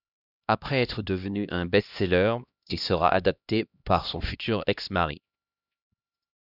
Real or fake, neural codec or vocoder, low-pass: fake; codec, 16 kHz, 1 kbps, X-Codec, HuBERT features, trained on LibriSpeech; 5.4 kHz